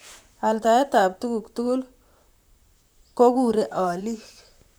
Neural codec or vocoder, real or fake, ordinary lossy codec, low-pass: vocoder, 44.1 kHz, 128 mel bands, Pupu-Vocoder; fake; none; none